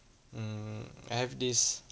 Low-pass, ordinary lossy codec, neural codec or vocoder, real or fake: none; none; none; real